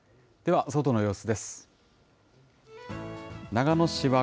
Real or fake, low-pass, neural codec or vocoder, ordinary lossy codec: real; none; none; none